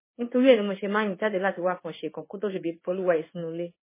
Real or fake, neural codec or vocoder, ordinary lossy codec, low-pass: fake; codec, 16 kHz in and 24 kHz out, 1 kbps, XY-Tokenizer; MP3, 16 kbps; 3.6 kHz